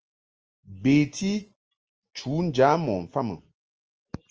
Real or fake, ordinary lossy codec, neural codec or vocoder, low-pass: real; Opus, 24 kbps; none; 7.2 kHz